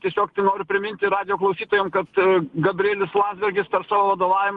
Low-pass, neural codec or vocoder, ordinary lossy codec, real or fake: 10.8 kHz; none; Opus, 24 kbps; real